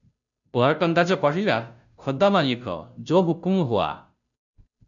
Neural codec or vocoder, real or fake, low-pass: codec, 16 kHz, 0.5 kbps, FunCodec, trained on Chinese and English, 25 frames a second; fake; 7.2 kHz